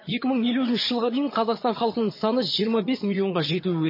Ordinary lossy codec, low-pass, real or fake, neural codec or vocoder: MP3, 24 kbps; 5.4 kHz; fake; vocoder, 22.05 kHz, 80 mel bands, HiFi-GAN